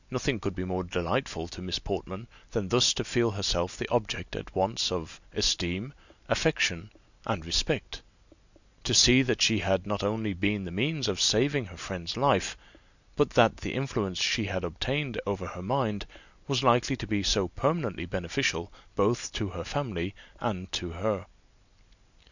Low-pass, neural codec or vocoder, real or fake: 7.2 kHz; none; real